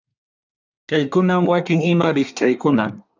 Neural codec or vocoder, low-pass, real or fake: codec, 24 kHz, 1 kbps, SNAC; 7.2 kHz; fake